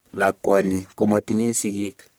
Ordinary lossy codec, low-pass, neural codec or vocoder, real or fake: none; none; codec, 44.1 kHz, 1.7 kbps, Pupu-Codec; fake